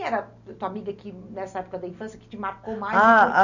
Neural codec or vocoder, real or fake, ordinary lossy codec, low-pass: none; real; none; 7.2 kHz